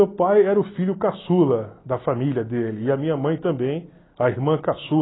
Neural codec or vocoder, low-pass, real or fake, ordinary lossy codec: none; 7.2 kHz; real; AAC, 16 kbps